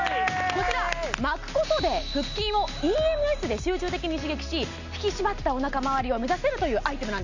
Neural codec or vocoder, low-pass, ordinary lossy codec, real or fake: none; 7.2 kHz; none; real